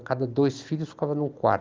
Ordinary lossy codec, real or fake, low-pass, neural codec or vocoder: Opus, 32 kbps; real; 7.2 kHz; none